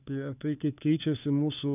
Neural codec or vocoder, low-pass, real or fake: codec, 16 kHz, 4 kbps, FunCodec, trained on LibriTTS, 50 frames a second; 3.6 kHz; fake